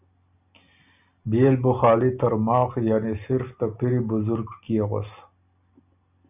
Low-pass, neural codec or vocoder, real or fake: 3.6 kHz; none; real